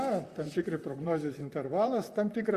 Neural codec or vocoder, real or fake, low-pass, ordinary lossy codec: vocoder, 44.1 kHz, 128 mel bands, Pupu-Vocoder; fake; 14.4 kHz; Opus, 64 kbps